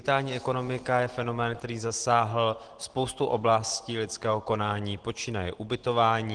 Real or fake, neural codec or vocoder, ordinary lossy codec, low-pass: real; none; Opus, 16 kbps; 9.9 kHz